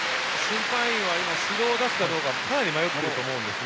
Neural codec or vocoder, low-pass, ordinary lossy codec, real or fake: none; none; none; real